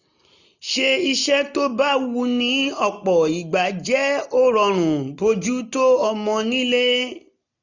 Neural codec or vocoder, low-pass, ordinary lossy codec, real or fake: none; 7.2 kHz; none; real